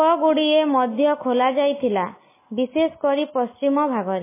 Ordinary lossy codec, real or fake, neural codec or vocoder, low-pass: MP3, 16 kbps; real; none; 3.6 kHz